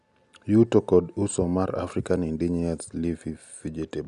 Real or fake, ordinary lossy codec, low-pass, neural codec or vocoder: real; none; 10.8 kHz; none